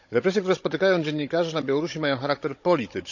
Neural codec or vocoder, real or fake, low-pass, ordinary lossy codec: codec, 16 kHz, 16 kbps, FunCodec, trained on Chinese and English, 50 frames a second; fake; 7.2 kHz; none